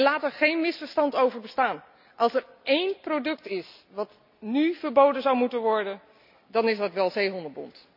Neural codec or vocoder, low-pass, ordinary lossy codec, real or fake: none; 5.4 kHz; none; real